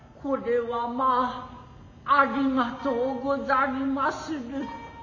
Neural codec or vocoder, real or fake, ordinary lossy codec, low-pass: none; real; none; 7.2 kHz